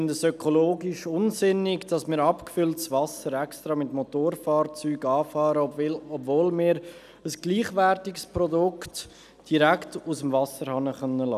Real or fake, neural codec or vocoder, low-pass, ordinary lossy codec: real; none; 14.4 kHz; none